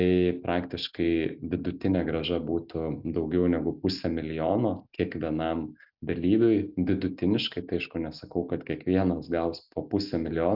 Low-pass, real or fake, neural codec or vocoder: 5.4 kHz; real; none